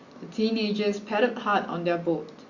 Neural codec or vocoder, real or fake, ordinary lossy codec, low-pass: none; real; Opus, 64 kbps; 7.2 kHz